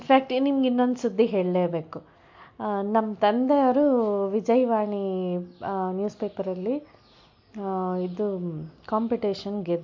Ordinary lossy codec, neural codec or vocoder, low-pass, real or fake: MP3, 48 kbps; none; 7.2 kHz; real